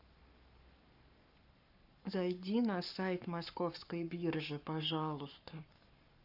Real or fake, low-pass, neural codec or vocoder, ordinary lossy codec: fake; 5.4 kHz; codec, 44.1 kHz, 7.8 kbps, Pupu-Codec; none